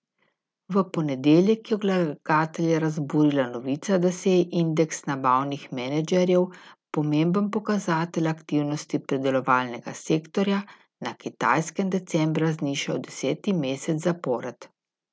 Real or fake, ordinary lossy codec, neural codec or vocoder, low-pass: real; none; none; none